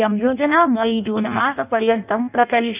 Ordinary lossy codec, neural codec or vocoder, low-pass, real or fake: none; codec, 16 kHz in and 24 kHz out, 0.6 kbps, FireRedTTS-2 codec; 3.6 kHz; fake